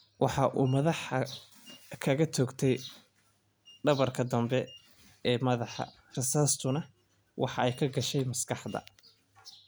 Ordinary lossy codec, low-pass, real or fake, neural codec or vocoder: none; none; real; none